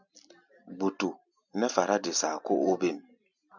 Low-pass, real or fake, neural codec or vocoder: 7.2 kHz; real; none